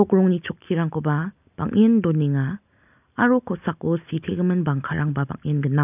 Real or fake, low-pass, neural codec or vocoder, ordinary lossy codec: real; 3.6 kHz; none; none